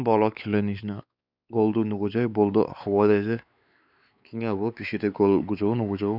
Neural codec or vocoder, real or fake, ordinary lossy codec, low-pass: codec, 16 kHz, 4 kbps, X-Codec, WavLM features, trained on Multilingual LibriSpeech; fake; none; 5.4 kHz